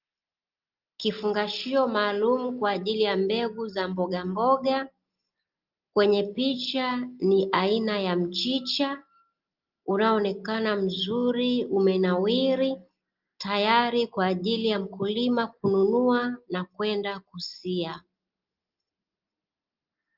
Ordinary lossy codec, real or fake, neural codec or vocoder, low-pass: Opus, 32 kbps; real; none; 5.4 kHz